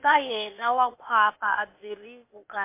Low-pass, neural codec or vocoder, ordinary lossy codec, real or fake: 3.6 kHz; codec, 16 kHz, 0.8 kbps, ZipCodec; MP3, 24 kbps; fake